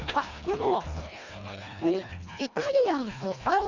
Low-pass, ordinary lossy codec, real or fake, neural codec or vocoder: 7.2 kHz; Opus, 64 kbps; fake; codec, 24 kHz, 1.5 kbps, HILCodec